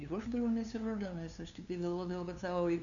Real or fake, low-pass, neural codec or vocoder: fake; 7.2 kHz; codec, 16 kHz, 2 kbps, FunCodec, trained on LibriTTS, 25 frames a second